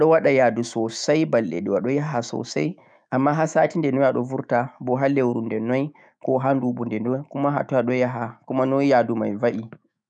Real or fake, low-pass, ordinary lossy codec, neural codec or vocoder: fake; 9.9 kHz; none; autoencoder, 48 kHz, 128 numbers a frame, DAC-VAE, trained on Japanese speech